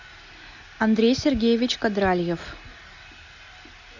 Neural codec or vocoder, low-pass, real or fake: none; 7.2 kHz; real